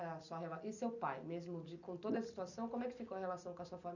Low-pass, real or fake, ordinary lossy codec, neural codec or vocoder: 7.2 kHz; real; none; none